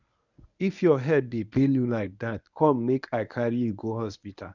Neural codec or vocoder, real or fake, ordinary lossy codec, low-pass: codec, 24 kHz, 0.9 kbps, WavTokenizer, medium speech release version 1; fake; none; 7.2 kHz